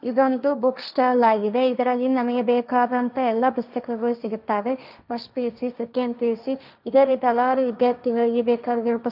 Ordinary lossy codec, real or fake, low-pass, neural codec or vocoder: none; fake; 5.4 kHz; codec, 16 kHz, 1.1 kbps, Voila-Tokenizer